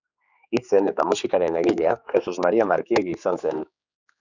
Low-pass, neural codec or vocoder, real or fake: 7.2 kHz; codec, 16 kHz, 4 kbps, X-Codec, HuBERT features, trained on general audio; fake